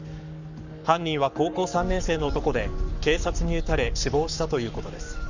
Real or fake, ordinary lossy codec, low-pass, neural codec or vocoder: fake; none; 7.2 kHz; codec, 44.1 kHz, 7.8 kbps, Pupu-Codec